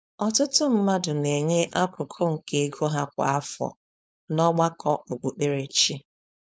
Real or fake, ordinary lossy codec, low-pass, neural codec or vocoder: fake; none; none; codec, 16 kHz, 4.8 kbps, FACodec